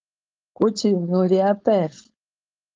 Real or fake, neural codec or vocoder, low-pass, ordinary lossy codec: fake; codec, 16 kHz, 4.8 kbps, FACodec; 7.2 kHz; Opus, 32 kbps